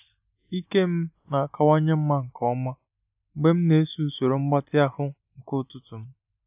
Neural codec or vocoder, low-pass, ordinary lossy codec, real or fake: none; 3.6 kHz; AAC, 32 kbps; real